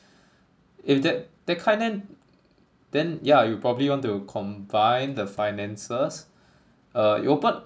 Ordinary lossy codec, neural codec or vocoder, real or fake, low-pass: none; none; real; none